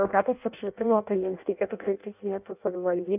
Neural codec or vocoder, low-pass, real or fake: codec, 16 kHz in and 24 kHz out, 0.6 kbps, FireRedTTS-2 codec; 3.6 kHz; fake